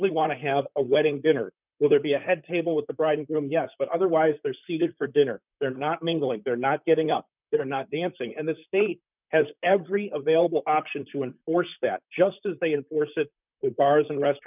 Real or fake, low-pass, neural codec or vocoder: fake; 3.6 kHz; vocoder, 44.1 kHz, 128 mel bands, Pupu-Vocoder